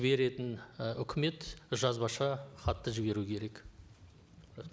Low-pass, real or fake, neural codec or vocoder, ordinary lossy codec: none; real; none; none